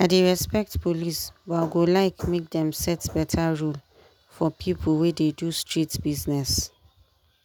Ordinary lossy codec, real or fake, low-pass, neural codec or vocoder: none; real; none; none